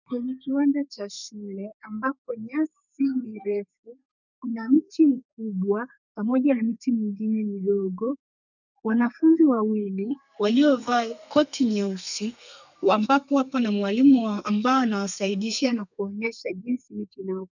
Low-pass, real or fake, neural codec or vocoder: 7.2 kHz; fake; codec, 32 kHz, 1.9 kbps, SNAC